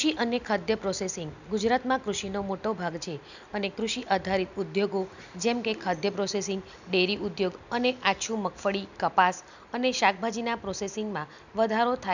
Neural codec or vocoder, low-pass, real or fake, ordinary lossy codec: none; 7.2 kHz; real; none